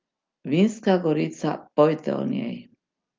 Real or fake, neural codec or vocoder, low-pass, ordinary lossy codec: real; none; 7.2 kHz; Opus, 24 kbps